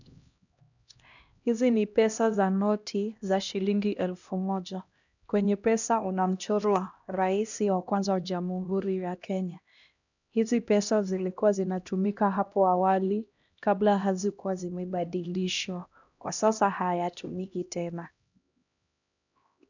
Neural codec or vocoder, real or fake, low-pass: codec, 16 kHz, 1 kbps, X-Codec, HuBERT features, trained on LibriSpeech; fake; 7.2 kHz